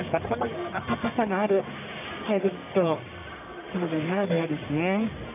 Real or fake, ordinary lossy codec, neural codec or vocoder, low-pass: fake; none; codec, 44.1 kHz, 1.7 kbps, Pupu-Codec; 3.6 kHz